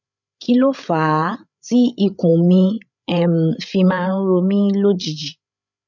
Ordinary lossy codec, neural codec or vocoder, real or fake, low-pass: none; codec, 16 kHz, 8 kbps, FreqCodec, larger model; fake; 7.2 kHz